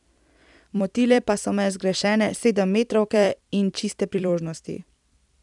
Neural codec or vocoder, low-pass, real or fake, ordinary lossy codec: vocoder, 44.1 kHz, 128 mel bands every 256 samples, BigVGAN v2; 10.8 kHz; fake; none